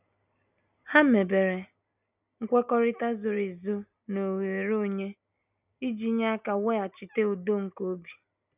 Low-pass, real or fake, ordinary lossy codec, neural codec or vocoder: 3.6 kHz; real; none; none